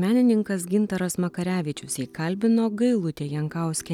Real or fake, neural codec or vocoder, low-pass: real; none; 19.8 kHz